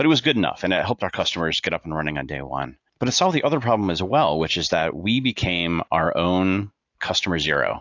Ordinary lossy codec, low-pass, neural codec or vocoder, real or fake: AAC, 48 kbps; 7.2 kHz; none; real